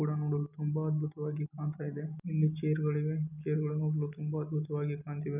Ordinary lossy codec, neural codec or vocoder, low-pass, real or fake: none; none; 3.6 kHz; real